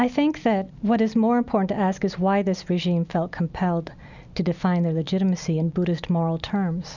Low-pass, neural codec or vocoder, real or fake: 7.2 kHz; none; real